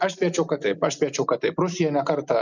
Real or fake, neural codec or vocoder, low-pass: real; none; 7.2 kHz